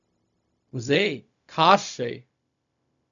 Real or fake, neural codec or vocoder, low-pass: fake; codec, 16 kHz, 0.4 kbps, LongCat-Audio-Codec; 7.2 kHz